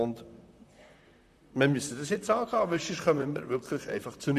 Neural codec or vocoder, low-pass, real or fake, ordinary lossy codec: vocoder, 44.1 kHz, 128 mel bands, Pupu-Vocoder; 14.4 kHz; fake; none